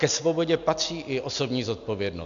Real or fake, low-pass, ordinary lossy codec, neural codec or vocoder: real; 7.2 kHz; MP3, 64 kbps; none